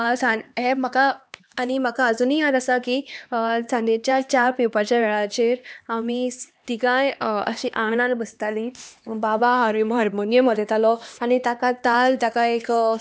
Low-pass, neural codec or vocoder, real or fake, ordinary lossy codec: none; codec, 16 kHz, 2 kbps, X-Codec, HuBERT features, trained on LibriSpeech; fake; none